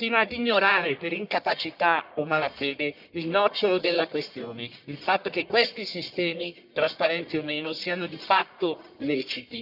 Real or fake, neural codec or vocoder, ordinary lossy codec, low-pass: fake; codec, 44.1 kHz, 1.7 kbps, Pupu-Codec; none; 5.4 kHz